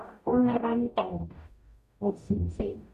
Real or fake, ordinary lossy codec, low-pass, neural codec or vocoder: fake; none; 14.4 kHz; codec, 44.1 kHz, 0.9 kbps, DAC